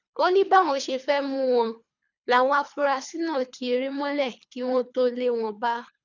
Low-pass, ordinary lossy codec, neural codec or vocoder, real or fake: 7.2 kHz; none; codec, 24 kHz, 3 kbps, HILCodec; fake